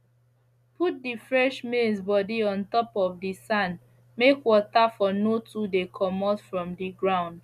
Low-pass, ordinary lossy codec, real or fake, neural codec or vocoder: 14.4 kHz; none; real; none